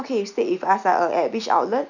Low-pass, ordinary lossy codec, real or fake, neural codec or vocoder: 7.2 kHz; none; real; none